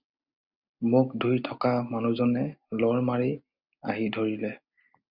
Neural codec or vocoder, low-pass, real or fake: none; 5.4 kHz; real